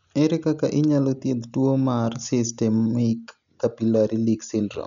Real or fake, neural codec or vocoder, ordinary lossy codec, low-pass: real; none; none; 7.2 kHz